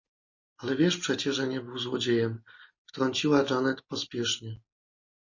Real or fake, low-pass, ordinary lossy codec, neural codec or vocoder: real; 7.2 kHz; MP3, 32 kbps; none